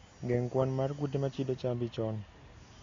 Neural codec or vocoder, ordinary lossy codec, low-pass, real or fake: none; AAC, 32 kbps; 7.2 kHz; real